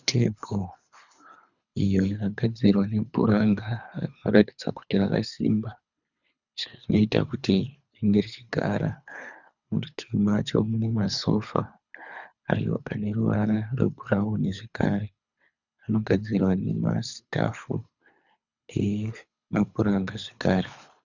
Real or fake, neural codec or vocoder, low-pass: fake; codec, 24 kHz, 3 kbps, HILCodec; 7.2 kHz